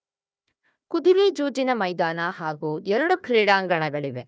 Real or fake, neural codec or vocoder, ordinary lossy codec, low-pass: fake; codec, 16 kHz, 1 kbps, FunCodec, trained on Chinese and English, 50 frames a second; none; none